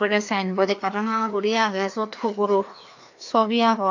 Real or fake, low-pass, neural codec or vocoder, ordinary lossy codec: fake; 7.2 kHz; codec, 16 kHz, 2 kbps, FreqCodec, larger model; AAC, 48 kbps